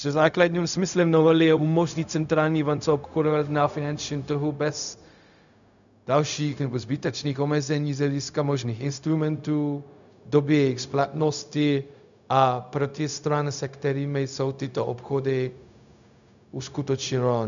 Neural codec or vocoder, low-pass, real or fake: codec, 16 kHz, 0.4 kbps, LongCat-Audio-Codec; 7.2 kHz; fake